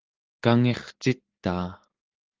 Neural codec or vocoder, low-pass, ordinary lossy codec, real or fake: none; 7.2 kHz; Opus, 16 kbps; real